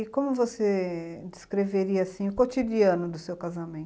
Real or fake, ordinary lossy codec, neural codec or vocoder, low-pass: real; none; none; none